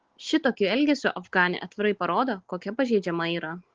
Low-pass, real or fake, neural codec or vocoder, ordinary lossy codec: 7.2 kHz; real; none; Opus, 16 kbps